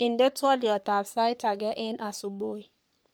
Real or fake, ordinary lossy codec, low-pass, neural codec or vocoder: fake; none; none; codec, 44.1 kHz, 3.4 kbps, Pupu-Codec